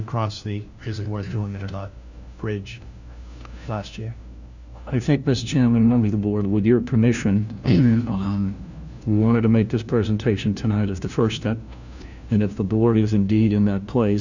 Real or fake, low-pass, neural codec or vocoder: fake; 7.2 kHz; codec, 16 kHz, 1 kbps, FunCodec, trained on LibriTTS, 50 frames a second